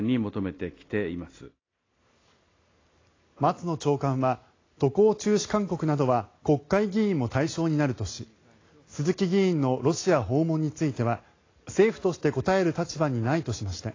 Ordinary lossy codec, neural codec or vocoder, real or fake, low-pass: AAC, 32 kbps; none; real; 7.2 kHz